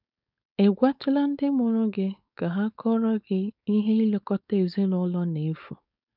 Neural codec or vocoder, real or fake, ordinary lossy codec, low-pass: codec, 16 kHz, 4.8 kbps, FACodec; fake; none; 5.4 kHz